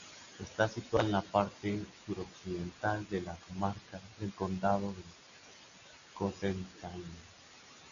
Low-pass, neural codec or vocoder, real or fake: 7.2 kHz; none; real